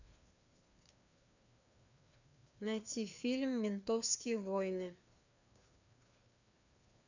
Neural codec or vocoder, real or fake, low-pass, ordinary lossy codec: codec, 16 kHz, 2 kbps, FreqCodec, larger model; fake; 7.2 kHz; none